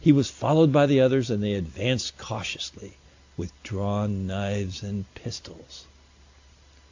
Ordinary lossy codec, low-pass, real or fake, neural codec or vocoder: AAC, 48 kbps; 7.2 kHz; real; none